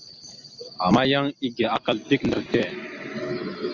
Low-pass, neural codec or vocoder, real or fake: 7.2 kHz; none; real